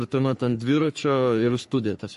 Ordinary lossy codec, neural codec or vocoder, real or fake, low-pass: MP3, 48 kbps; codec, 44.1 kHz, 3.4 kbps, Pupu-Codec; fake; 14.4 kHz